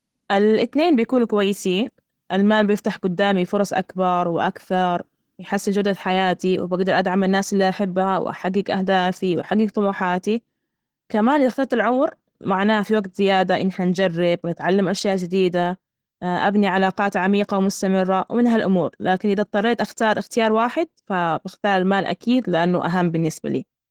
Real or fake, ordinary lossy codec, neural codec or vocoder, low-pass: real; Opus, 16 kbps; none; 19.8 kHz